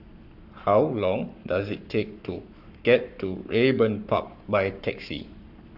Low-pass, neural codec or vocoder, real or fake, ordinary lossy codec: 5.4 kHz; codec, 44.1 kHz, 7.8 kbps, Pupu-Codec; fake; none